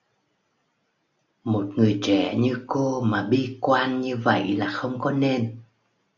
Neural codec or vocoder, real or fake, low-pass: none; real; 7.2 kHz